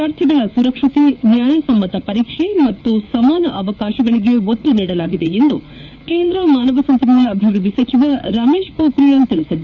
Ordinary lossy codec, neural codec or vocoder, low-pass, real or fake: none; codec, 16 kHz, 4 kbps, FreqCodec, larger model; 7.2 kHz; fake